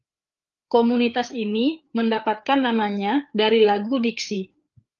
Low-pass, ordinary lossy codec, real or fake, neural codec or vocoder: 7.2 kHz; Opus, 32 kbps; fake; codec, 16 kHz, 4 kbps, FreqCodec, larger model